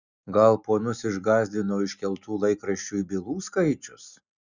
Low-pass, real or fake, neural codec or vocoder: 7.2 kHz; real; none